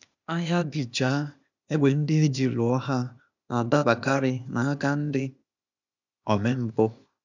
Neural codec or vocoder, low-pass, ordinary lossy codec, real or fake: codec, 16 kHz, 0.8 kbps, ZipCodec; 7.2 kHz; none; fake